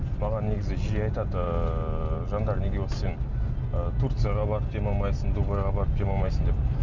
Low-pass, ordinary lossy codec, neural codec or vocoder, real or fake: 7.2 kHz; none; none; real